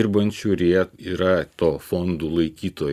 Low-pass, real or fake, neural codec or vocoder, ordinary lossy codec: 14.4 kHz; real; none; Opus, 64 kbps